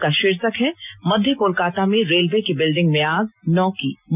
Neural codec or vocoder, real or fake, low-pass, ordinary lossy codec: none; real; 3.6 kHz; none